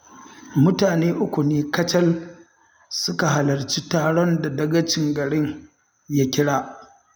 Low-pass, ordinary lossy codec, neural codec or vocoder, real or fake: none; none; none; real